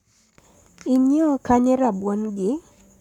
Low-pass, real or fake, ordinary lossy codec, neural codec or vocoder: 19.8 kHz; fake; none; codec, 44.1 kHz, 7.8 kbps, Pupu-Codec